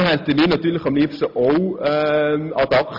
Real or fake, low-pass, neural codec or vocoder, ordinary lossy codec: real; 5.4 kHz; none; MP3, 48 kbps